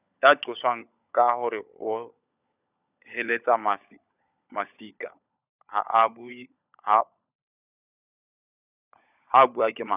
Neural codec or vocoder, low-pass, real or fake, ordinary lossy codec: codec, 16 kHz, 16 kbps, FunCodec, trained on LibriTTS, 50 frames a second; 3.6 kHz; fake; none